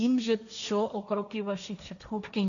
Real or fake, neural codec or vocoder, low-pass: fake; codec, 16 kHz, 1.1 kbps, Voila-Tokenizer; 7.2 kHz